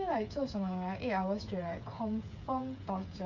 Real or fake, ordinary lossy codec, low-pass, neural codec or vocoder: fake; none; 7.2 kHz; codec, 16 kHz, 8 kbps, FreqCodec, smaller model